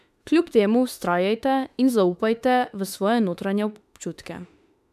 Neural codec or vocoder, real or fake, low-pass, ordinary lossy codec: autoencoder, 48 kHz, 32 numbers a frame, DAC-VAE, trained on Japanese speech; fake; 14.4 kHz; none